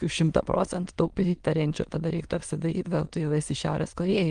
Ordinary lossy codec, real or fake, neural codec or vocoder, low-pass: Opus, 24 kbps; fake; autoencoder, 22.05 kHz, a latent of 192 numbers a frame, VITS, trained on many speakers; 9.9 kHz